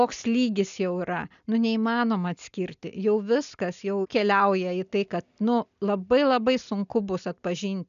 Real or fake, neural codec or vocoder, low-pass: real; none; 7.2 kHz